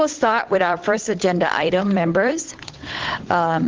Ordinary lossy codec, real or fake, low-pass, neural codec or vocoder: Opus, 16 kbps; fake; 7.2 kHz; codec, 16 kHz, 8 kbps, FunCodec, trained on Chinese and English, 25 frames a second